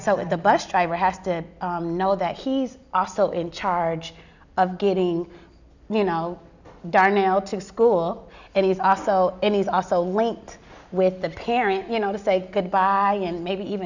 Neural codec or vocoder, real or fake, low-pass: none; real; 7.2 kHz